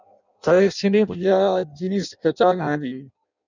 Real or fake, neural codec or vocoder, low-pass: fake; codec, 16 kHz in and 24 kHz out, 0.6 kbps, FireRedTTS-2 codec; 7.2 kHz